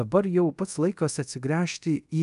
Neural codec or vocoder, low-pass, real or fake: codec, 24 kHz, 0.5 kbps, DualCodec; 10.8 kHz; fake